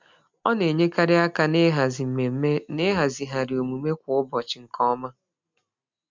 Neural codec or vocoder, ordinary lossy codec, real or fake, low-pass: none; MP3, 64 kbps; real; 7.2 kHz